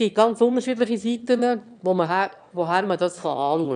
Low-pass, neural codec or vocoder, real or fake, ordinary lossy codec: 9.9 kHz; autoencoder, 22.05 kHz, a latent of 192 numbers a frame, VITS, trained on one speaker; fake; none